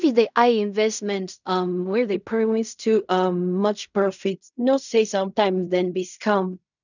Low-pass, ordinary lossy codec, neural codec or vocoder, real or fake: 7.2 kHz; none; codec, 16 kHz in and 24 kHz out, 0.4 kbps, LongCat-Audio-Codec, fine tuned four codebook decoder; fake